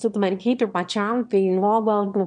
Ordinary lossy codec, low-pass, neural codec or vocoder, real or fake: MP3, 64 kbps; 9.9 kHz; autoencoder, 22.05 kHz, a latent of 192 numbers a frame, VITS, trained on one speaker; fake